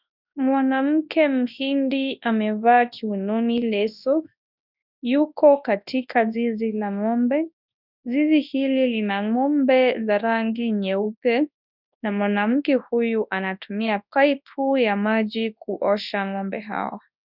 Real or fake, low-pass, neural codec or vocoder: fake; 5.4 kHz; codec, 24 kHz, 0.9 kbps, WavTokenizer, large speech release